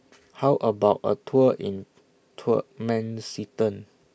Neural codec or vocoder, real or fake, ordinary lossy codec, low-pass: none; real; none; none